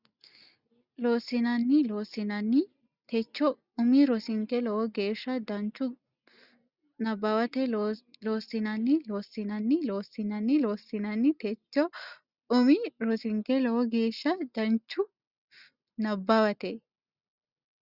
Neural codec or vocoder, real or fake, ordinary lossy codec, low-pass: none; real; Opus, 64 kbps; 5.4 kHz